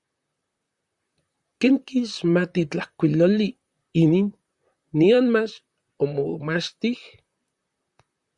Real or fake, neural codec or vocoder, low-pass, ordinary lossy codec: fake; vocoder, 44.1 kHz, 128 mel bands, Pupu-Vocoder; 10.8 kHz; Opus, 64 kbps